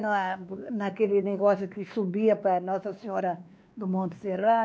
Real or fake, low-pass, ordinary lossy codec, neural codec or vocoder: fake; none; none; codec, 16 kHz, 2 kbps, X-Codec, WavLM features, trained on Multilingual LibriSpeech